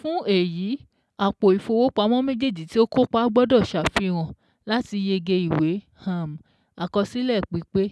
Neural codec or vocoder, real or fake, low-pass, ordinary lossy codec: none; real; none; none